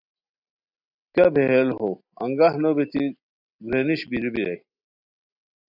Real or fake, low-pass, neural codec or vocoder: real; 5.4 kHz; none